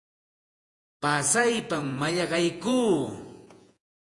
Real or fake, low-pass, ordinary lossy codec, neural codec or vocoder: fake; 10.8 kHz; AAC, 48 kbps; vocoder, 48 kHz, 128 mel bands, Vocos